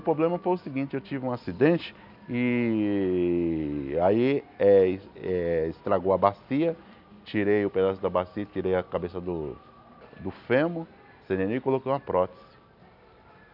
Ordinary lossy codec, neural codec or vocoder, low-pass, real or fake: none; none; 5.4 kHz; real